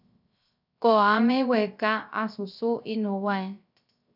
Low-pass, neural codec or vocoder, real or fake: 5.4 kHz; codec, 16 kHz, 0.3 kbps, FocalCodec; fake